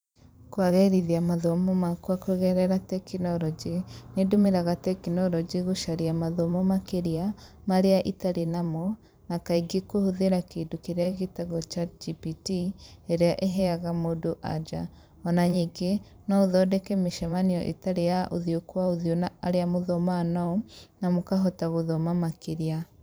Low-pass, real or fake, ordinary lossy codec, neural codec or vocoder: none; fake; none; vocoder, 44.1 kHz, 128 mel bands every 512 samples, BigVGAN v2